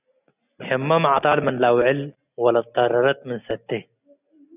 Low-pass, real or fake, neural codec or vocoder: 3.6 kHz; real; none